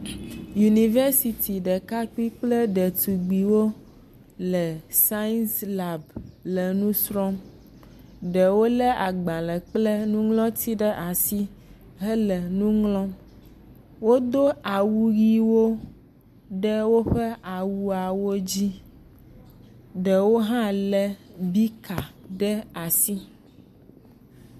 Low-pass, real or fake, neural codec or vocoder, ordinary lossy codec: 14.4 kHz; real; none; MP3, 96 kbps